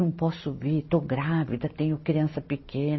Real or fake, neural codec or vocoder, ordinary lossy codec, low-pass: real; none; MP3, 24 kbps; 7.2 kHz